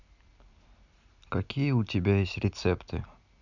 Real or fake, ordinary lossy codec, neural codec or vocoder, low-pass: real; none; none; 7.2 kHz